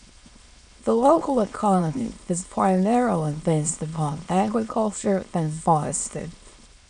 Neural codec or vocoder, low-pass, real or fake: autoencoder, 22.05 kHz, a latent of 192 numbers a frame, VITS, trained on many speakers; 9.9 kHz; fake